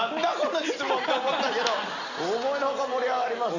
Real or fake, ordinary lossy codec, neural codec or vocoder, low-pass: real; none; none; 7.2 kHz